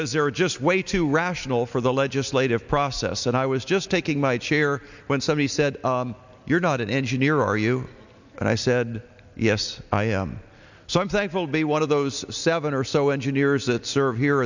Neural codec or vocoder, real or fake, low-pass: none; real; 7.2 kHz